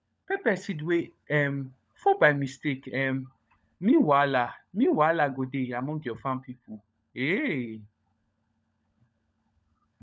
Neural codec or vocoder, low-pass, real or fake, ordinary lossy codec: codec, 16 kHz, 16 kbps, FunCodec, trained on LibriTTS, 50 frames a second; none; fake; none